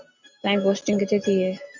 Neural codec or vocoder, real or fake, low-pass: none; real; 7.2 kHz